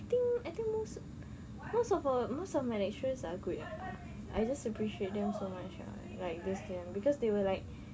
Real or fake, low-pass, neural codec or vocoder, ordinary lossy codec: real; none; none; none